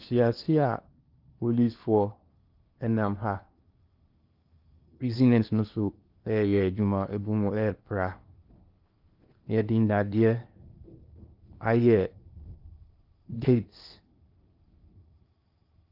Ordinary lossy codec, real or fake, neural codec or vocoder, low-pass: Opus, 32 kbps; fake; codec, 16 kHz in and 24 kHz out, 0.8 kbps, FocalCodec, streaming, 65536 codes; 5.4 kHz